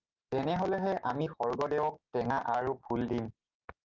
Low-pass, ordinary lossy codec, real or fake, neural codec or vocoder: 7.2 kHz; Opus, 32 kbps; real; none